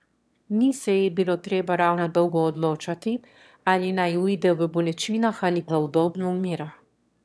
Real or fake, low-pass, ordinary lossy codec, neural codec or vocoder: fake; none; none; autoencoder, 22.05 kHz, a latent of 192 numbers a frame, VITS, trained on one speaker